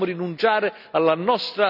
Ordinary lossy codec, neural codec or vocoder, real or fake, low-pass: none; none; real; 5.4 kHz